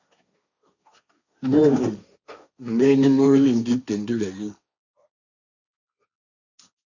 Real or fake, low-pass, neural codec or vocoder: fake; 7.2 kHz; codec, 16 kHz, 1.1 kbps, Voila-Tokenizer